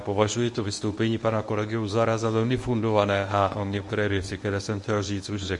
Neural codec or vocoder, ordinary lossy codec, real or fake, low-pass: codec, 24 kHz, 0.9 kbps, WavTokenizer, medium speech release version 1; MP3, 48 kbps; fake; 10.8 kHz